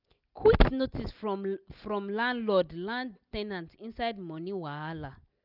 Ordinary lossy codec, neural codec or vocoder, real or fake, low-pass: none; none; real; 5.4 kHz